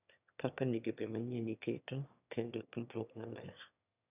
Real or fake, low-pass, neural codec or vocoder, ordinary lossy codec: fake; 3.6 kHz; autoencoder, 22.05 kHz, a latent of 192 numbers a frame, VITS, trained on one speaker; none